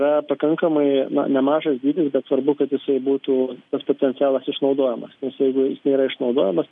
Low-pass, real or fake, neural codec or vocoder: 10.8 kHz; real; none